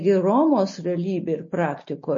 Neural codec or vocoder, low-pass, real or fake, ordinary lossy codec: none; 7.2 kHz; real; MP3, 32 kbps